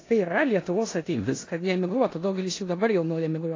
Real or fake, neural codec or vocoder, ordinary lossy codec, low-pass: fake; codec, 16 kHz in and 24 kHz out, 0.9 kbps, LongCat-Audio-Codec, four codebook decoder; AAC, 32 kbps; 7.2 kHz